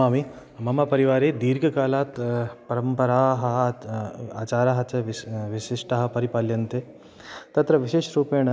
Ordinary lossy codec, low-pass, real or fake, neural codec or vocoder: none; none; real; none